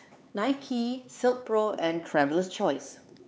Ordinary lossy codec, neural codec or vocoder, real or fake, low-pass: none; codec, 16 kHz, 4 kbps, X-Codec, HuBERT features, trained on LibriSpeech; fake; none